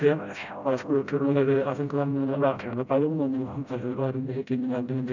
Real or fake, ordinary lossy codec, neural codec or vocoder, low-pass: fake; none; codec, 16 kHz, 0.5 kbps, FreqCodec, smaller model; 7.2 kHz